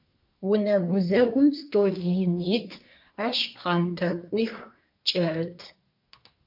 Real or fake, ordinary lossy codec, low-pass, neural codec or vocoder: fake; MP3, 48 kbps; 5.4 kHz; codec, 24 kHz, 1 kbps, SNAC